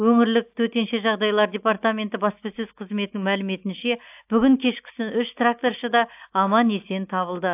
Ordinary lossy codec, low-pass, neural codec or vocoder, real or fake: AAC, 32 kbps; 3.6 kHz; none; real